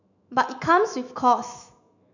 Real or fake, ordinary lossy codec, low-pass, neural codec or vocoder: fake; none; 7.2 kHz; autoencoder, 48 kHz, 128 numbers a frame, DAC-VAE, trained on Japanese speech